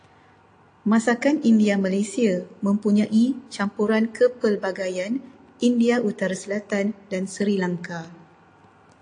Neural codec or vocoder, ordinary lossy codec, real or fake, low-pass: none; AAC, 48 kbps; real; 9.9 kHz